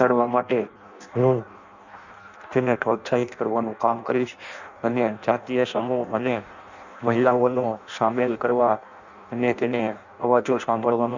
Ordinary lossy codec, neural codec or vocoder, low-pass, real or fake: none; codec, 16 kHz in and 24 kHz out, 0.6 kbps, FireRedTTS-2 codec; 7.2 kHz; fake